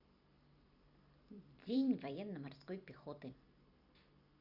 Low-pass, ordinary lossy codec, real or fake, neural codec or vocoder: 5.4 kHz; Opus, 64 kbps; fake; vocoder, 44.1 kHz, 128 mel bands every 256 samples, BigVGAN v2